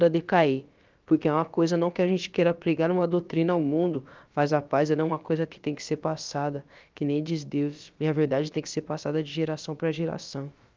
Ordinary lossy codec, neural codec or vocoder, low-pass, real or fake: Opus, 24 kbps; codec, 16 kHz, about 1 kbps, DyCAST, with the encoder's durations; 7.2 kHz; fake